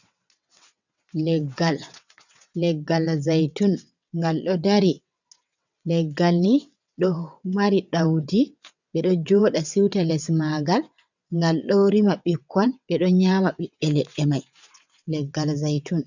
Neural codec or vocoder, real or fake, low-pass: vocoder, 22.05 kHz, 80 mel bands, WaveNeXt; fake; 7.2 kHz